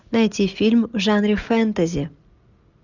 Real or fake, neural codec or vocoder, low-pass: real; none; 7.2 kHz